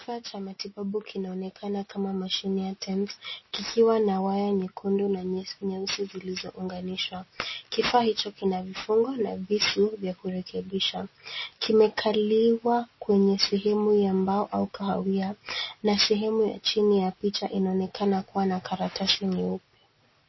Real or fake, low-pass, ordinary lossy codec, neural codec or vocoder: real; 7.2 kHz; MP3, 24 kbps; none